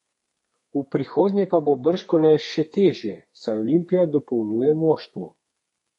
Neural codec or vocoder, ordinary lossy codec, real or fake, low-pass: codec, 32 kHz, 1.9 kbps, SNAC; MP3, 48 kbps; fake; 14.4 kHz